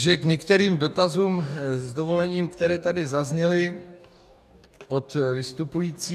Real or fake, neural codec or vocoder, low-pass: fake; codec, 44.1 kHz, 2.6 kbps, DAC; 14.4 kHz